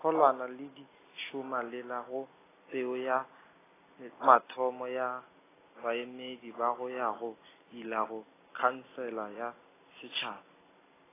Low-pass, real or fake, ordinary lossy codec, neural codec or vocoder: 3.6 kHz; real; AAC, 16 kbps; none